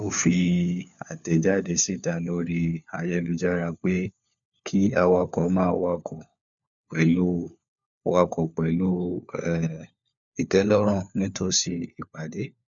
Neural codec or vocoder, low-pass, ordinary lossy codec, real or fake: codec, 16 kHz, 4 kbps, FunCodec, trained on LibriTTS, 50 frames a second; 7.2 kHz; none; fake